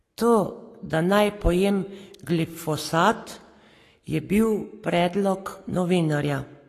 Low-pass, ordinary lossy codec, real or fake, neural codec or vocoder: 14.4 kHz; AAC, 48 kbps; fake; codec, 44.1 kHz, 7.8 kbps, Pupu-Codec